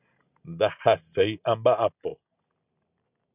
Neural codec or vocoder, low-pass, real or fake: none; 3.6 kHz; real